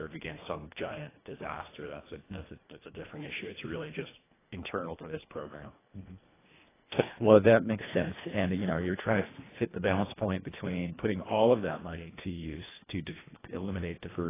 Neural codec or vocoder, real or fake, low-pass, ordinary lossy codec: codec, 24 kHz, 1.5 kbps, HILCodec; fake; 3.6 kHz; AAC, 16 kbps